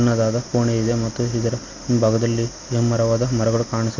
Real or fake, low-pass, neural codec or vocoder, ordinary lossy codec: real; 7.2 kHz; none; none